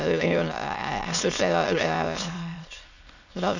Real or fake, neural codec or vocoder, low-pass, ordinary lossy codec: fake; autoencoder, 22.05 kHz, a latent of 192 numbers a frame, VITS, trained on many speakers; 7.2 kHz; none